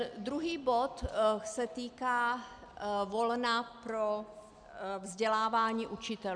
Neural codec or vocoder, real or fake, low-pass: none; real; 9.9 kHz